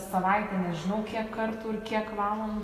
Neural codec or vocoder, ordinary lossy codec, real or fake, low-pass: none; AAC, 48 kbps; real; 14.4 kHz